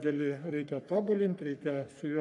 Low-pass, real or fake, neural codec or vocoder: 10.8 kHz; fake; codec, 44.1 kHz, 3.4 kbps, Pupu-Codec